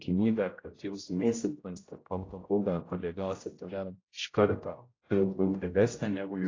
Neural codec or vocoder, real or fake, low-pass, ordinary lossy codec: codec, 16 kHz, 0.5 kbps, X-Codec, HuBERT features, trained on general audio; fake; 7.2 kHz; AAC, 32 kbps